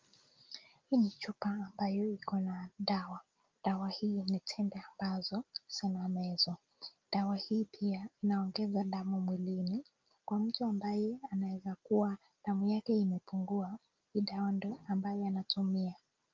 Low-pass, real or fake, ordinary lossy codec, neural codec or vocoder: 7.2 kHz; real; Opus, 32 kbps; none